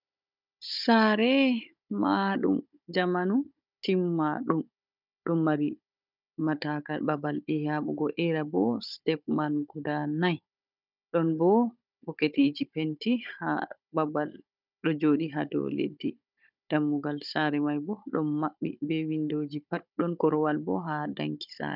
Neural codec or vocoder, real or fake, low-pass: codec, 16 kHz, 16 kbps, FunCodec, trained on Chinese and English, 50 frames a second; fake; 5.4 kHz